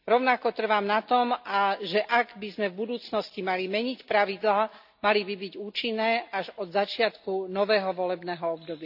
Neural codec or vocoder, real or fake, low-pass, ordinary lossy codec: none; real; 5.4 kHz; AAC, 48 kbps